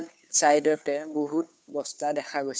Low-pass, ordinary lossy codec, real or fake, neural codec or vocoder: none; none; fake; codec, 16 kHz, 2 kbps, FunCodec, trained on Chinese and English, 25 frames a second